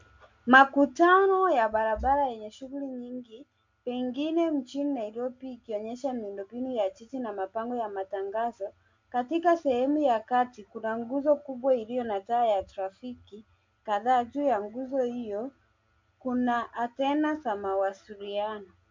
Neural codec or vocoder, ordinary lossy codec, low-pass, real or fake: none; MP3, 64 kbps; 7.2 kHz; real